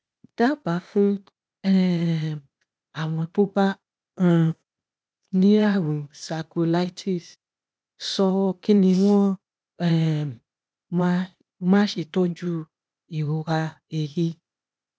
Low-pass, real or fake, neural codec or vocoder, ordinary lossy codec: none; fake; codec, 16 kHz, 0.8 kbps, ZipCodec; none